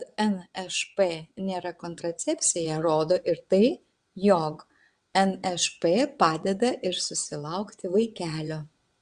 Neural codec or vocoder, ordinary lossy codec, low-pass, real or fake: vocoder, 22.05 kHz, 80 mel bands, WaveNeXt; Opus, 64 kbps; 9.9 kHz; fake